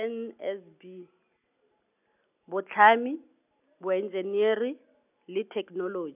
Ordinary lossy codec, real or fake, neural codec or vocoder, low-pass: none; real; none; 3.6 kHz